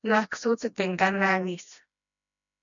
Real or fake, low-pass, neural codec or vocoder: fake; 7.2 kHz; codec, 16 kHz, 1 kbps, FreqCodec, smaller model